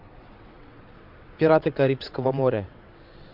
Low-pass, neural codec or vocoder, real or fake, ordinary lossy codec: 5.4 kHz; vocoder, 22.05 kHz, 80 mel bands, WaveNeXt; fake; MP3, 48 kbps